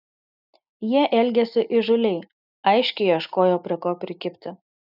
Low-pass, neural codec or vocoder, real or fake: 5.4 kHz; none; real